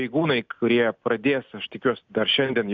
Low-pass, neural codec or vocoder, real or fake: 7.2 kHz; none; real